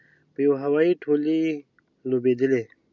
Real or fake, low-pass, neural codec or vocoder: real; 7.2 kHz; none